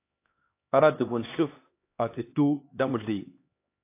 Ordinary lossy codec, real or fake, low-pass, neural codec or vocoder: AAC, 24 kbps; fake; 3.6 kHz; codec, 16 kHz, 2 kbps, X-Codec, HuBERT features, trained on LibriSpeech